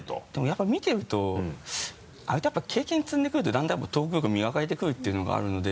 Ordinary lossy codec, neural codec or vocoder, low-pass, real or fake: none; none; none; real